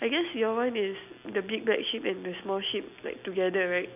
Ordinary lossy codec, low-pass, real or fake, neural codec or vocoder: none; 3.6 kHz; real; none